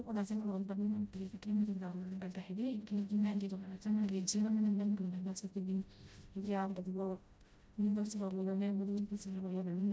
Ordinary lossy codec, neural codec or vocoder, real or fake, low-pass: none; codec, 16 kHz, 0.5 kbps, FreqCodec, smaller model; fake; none